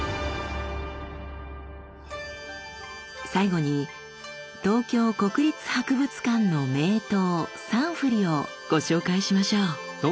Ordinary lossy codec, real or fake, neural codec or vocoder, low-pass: none; real; none; none